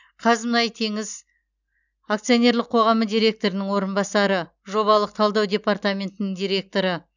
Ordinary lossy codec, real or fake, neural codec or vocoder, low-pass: none; real; none; 7.2 kHz